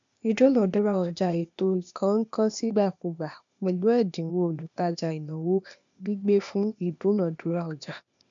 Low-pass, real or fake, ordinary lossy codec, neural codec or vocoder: 7.2 kHz; fake; MP3, 64 kbps; codec, 16 kHz, 0.8 kbps, ZipCodec